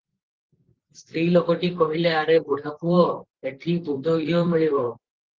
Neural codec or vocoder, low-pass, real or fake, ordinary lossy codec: vocoder, 44.1 kHz, 128 mel bands, Pupu-Vocoder; 7.2 kHz; fake; Opus, 16 kbps